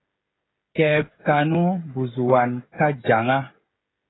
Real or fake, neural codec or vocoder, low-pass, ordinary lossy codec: fake; codec, 16 kHz, 16 kbps, FreqCodec, smaller model; 7.2 kHz; AAC, 16 kbps